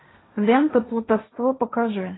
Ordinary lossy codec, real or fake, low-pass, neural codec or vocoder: AAC, 16 kbps; fake; 7.2 kHz; codec, 16 kHz, 1.1 kbps, Voila-Tokenizer